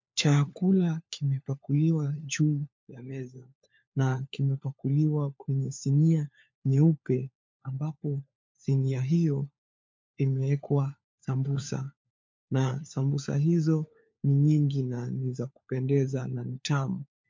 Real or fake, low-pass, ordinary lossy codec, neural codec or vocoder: fake; 7.2 kHz; MP3, 48 kbps; codec, 16 kHz, 4 kbps, FunCodec, trained on LibriTTS, 50 frames a second